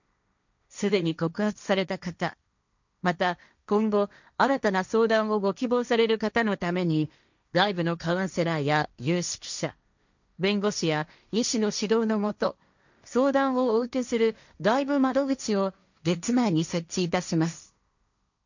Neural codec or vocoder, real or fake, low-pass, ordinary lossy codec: codec, 16 kHz, 1.1 kbps, Voila-Tokenizer; fake; 7.2 kHz; none